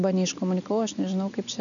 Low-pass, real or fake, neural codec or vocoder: 7.2 kHz; real; none